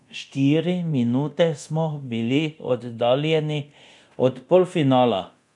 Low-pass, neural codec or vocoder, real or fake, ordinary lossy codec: 10.8 kHz; codec, 24 kHz, 0.9 kbps, DualCodec; fake; none